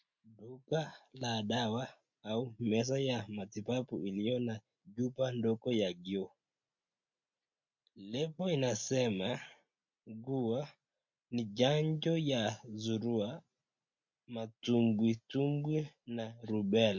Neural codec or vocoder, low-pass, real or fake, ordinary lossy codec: none; 7.2 kHz; real; MP3, 48 kbps